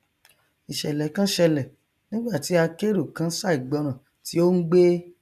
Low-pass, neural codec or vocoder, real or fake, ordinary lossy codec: 14.4 kHz; none; real; none